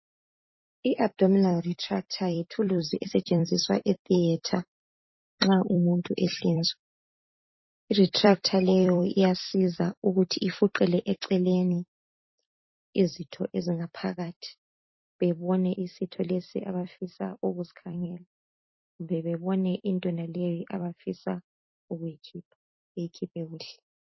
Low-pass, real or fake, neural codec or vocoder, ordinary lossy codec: 7.2 kHz; fake; vocoder, 44.1 kHz, 128 mel bands every 256 samples, BigVGAN v2; MP3, 24 kbps